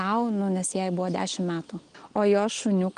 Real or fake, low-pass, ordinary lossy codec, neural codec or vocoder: real; 9.9 kHz; Opus, 24 kbps; none